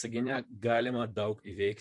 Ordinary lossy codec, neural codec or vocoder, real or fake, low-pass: MP3, 48 kbps; vocoder, 44.1 kHz, 128 mel bands, Pupu-Vocoder; fake; 10.8 kHz